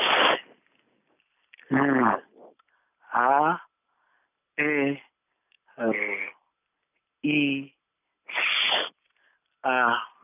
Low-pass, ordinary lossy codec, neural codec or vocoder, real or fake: 3.6 kHz; none; none; real